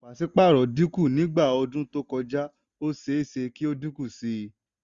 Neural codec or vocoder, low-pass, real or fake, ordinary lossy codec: none; 7.2 kHz; real; Opus, 64 kbps